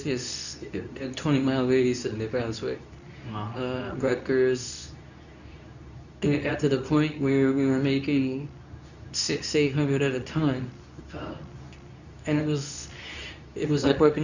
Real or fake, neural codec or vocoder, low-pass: fake; codec, 24 kHz, 0.9 kbps, WavTokenizer, medium speech release version 2; 7.2 kHz